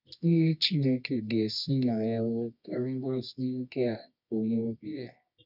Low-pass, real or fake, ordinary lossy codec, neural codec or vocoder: 5.4 kHz; fake; none; codec, 24 kHz, 0.9 kbps, WavTokenizer, medium music audio release